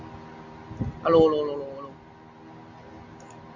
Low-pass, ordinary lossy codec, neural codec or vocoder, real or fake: 7.2 kHz; AAC, 48 kbps; none; real